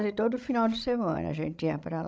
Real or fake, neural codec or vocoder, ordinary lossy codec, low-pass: fake; codec, 16 kHz, 16 kbps, FreqCodec, larger model; none; none